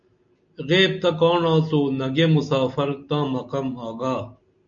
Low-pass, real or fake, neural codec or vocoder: 7.2 kHz; real; none